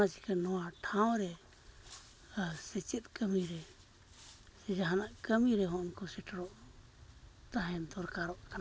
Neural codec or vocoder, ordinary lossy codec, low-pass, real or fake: none; none; none; real